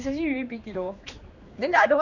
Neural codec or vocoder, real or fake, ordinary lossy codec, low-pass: codec, 16 kHz, 2 kbps, X-Codec, HuBERT features, trained on general audio; fake; none; 7.2 kHz